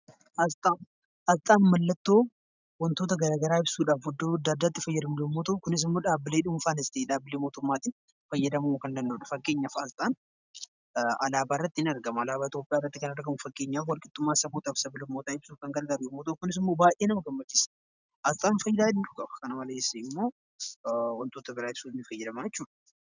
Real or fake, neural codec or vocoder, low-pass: real; none; 7.2 kHz